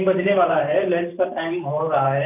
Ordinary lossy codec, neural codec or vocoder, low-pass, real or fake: none; vocoder, 44.1 kHz, 128 mel bands every 512 samples, BigVGAN v2; 3.6 kHz; fake